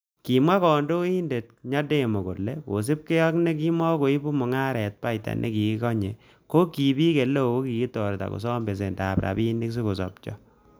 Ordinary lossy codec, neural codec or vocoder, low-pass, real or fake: none; none; none; real